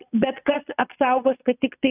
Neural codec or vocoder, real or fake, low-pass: vocoder, 22.05 kHz, 80 mel bands, Vocos; fake; 3.6 kHz